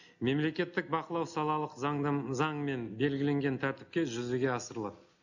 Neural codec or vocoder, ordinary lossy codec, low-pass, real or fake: none; none; 7.2 kHz; real